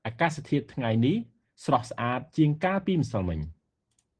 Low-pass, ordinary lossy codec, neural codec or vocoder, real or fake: 9.9 kHz; Opus, 16 kbps; none; real